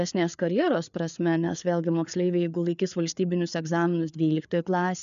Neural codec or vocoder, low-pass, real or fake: codec, 16 kHz, 4 kbps, FreqCodec, larger model; 7.2 kHz; fake